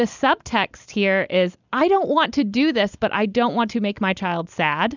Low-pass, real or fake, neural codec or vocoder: 7.2 kHz; real; none